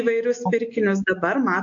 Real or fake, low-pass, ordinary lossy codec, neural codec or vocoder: real; 7.2 kHz; AAC, 48 kbps; none